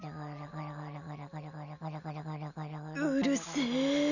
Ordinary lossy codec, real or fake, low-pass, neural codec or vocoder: none; real; 7.2 kHz; none